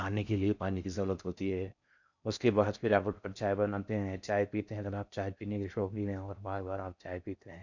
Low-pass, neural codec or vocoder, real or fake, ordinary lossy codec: 7.2 kHz; codec, 16 kHz in and 24 kHz out, 0.6 kbps, FocalCodec, streaming, 4096 codes; fake; none